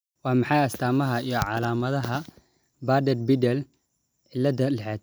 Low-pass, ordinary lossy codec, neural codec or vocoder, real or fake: none; none; none; real